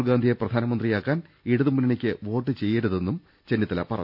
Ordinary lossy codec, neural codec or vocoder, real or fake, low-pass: none; none; real; 5.4 kHz